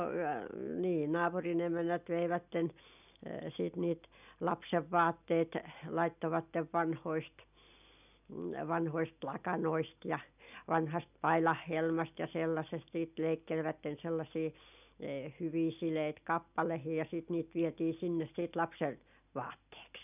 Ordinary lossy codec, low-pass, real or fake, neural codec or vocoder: none; 3.6 kHz; real; none